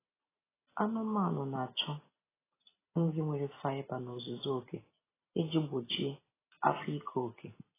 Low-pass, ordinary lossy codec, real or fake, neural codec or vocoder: 3.6 kHz; AAC, 16 kbps; real; none